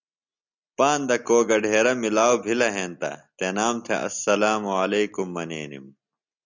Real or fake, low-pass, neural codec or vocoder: real; 7.2 kHz; none